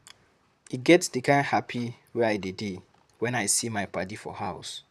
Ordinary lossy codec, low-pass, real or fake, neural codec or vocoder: none; 14.4 kHz; fake; vocoder, 44.1 kHz, 128 mel bands, Pupu-Vocoder